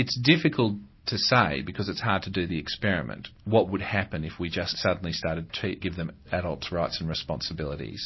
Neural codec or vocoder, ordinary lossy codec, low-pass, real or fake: none; MP3, 24 kbps; 7.2 kHz; real